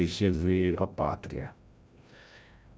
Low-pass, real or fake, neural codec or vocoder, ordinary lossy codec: none; fake; codec, 16 kHz, 0.5 kbps, FreqCodec, larger model; none